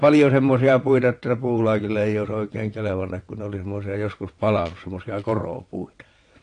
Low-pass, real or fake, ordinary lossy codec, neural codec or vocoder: 9.9 kHz; fake; AAC, 48 kbps; vocoder, 22.05 kHz, 80 mel bands, WaveNeXt